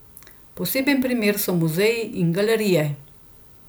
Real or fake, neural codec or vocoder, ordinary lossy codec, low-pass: real; none; none; none